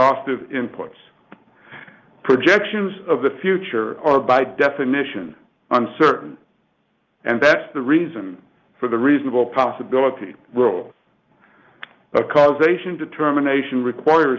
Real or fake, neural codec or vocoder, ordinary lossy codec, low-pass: real; none; Opus, 32 kbps; 7.2 kHz